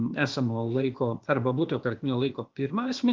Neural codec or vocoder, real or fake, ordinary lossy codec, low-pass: codec, 16 kHz, 0.8 kbps, ZipCodec; fake; Opus, 32 kbps; 7.2 kHz